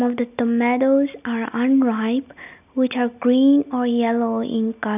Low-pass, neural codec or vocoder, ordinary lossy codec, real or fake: 3.6 kHz; none; none; real